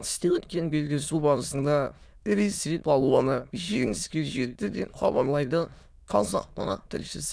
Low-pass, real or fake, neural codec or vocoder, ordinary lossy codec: none; fake; autoencoder, 22.05 kHz, a latent of 192 numbers a frame, VITS, trained on many speakers; none